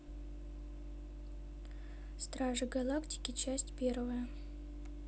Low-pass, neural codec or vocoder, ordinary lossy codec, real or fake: none; none; none; real